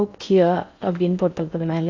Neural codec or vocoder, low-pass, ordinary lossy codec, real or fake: codec, 16 kHz in and 24 kHz out, 0.6 kbps, FocalCodec, streaming, 4096 codes; 7.2 kHz; AAC, 48 kbps; fake